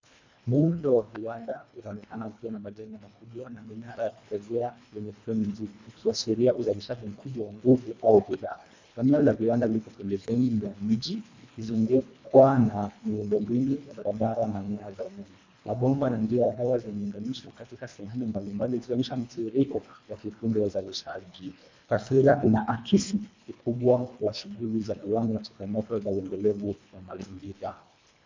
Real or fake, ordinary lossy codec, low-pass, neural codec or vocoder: fake; MP3, 64 kbps; 7.2 kHz; codec, 24 kHz, 1.5 kbps, HILCodec